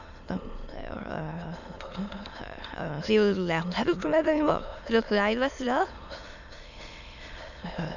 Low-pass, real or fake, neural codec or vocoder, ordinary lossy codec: 7.2 kHz; fake; autoencoder, 22.05 kHz, a latent of 192 numbers a frame, VITS, trained on many speakers; none